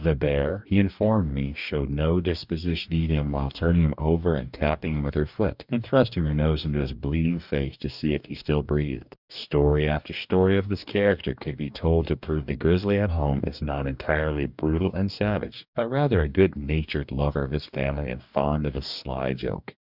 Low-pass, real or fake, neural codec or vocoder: 5.4 kHz; fake; codec, 44.1 kHz, 2.6 kbps, DAC